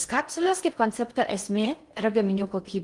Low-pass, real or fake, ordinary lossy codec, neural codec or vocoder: 10.8 kHz; fake; Opus, 24 kbps; codec, 16 kHz in and 24 kHz out, 0.6 kbps, FocalCodec, streaming, 4096 codes